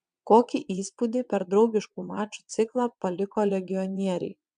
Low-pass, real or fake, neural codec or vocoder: 9.9 kHz; fake; vocoder, 22.05 kHz, 80 mel bands, WaveNeXt